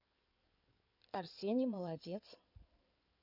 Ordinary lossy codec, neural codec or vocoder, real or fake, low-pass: none; codec, 16 kHz in and 24 kHz out, 2.2 kbps, FireRedTTS-2 codec; fake; 5.4 kHz